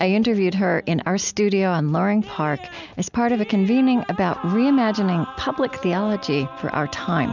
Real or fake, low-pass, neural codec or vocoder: real; 7.2 kHz; none